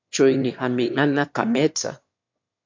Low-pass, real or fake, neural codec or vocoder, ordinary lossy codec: 7.2 kHz; fake; autoencoder, 22.05 kHz, a latent of 192 numbers a frame, VITS, trained on one speaker; MP3, 48 kbps